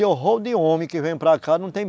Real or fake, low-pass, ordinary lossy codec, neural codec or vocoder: real; none; none; none